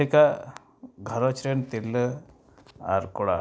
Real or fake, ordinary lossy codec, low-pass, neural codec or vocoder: real; none; none; none